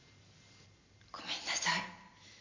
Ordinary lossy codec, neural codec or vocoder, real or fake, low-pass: MP3, 48 kbps; none; real; 7.2 kHz